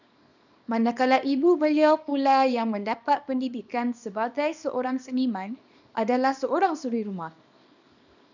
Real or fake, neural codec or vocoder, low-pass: fake; codec, 24 kHz, 0.9 kbps, WavTokenizer, small release; 7.2 kHz